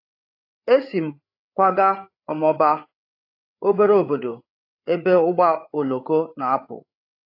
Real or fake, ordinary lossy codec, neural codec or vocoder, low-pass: fake; AAC, 32 kbps; codec, 16 kHz, 8 kbps, FreqCodec, larger model; 5.4 kHz